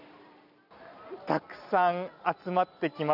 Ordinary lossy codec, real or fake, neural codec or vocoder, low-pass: none; real; none; 5.4 kHz